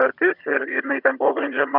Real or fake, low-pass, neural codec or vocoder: fake; 5.4 kHz; vocoder, 22.05 kHz, 80 mel bands, HiFi-GAN